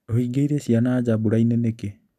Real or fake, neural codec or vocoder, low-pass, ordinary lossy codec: real; none; 14.4 kHz; none